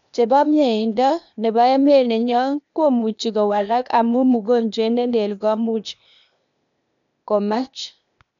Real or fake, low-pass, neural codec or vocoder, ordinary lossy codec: fake; 7.2 kHz; codec, 16 kHz, 0.8 kbps, ZipCodec; none